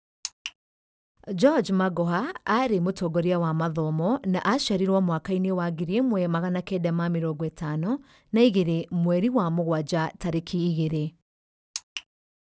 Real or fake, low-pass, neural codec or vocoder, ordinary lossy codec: real; none; none; none